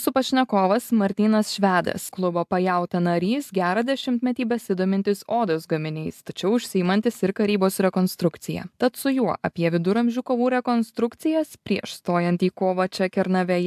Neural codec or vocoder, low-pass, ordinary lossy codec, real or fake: none; 14.4 kHz; AAC, 96 kbps; real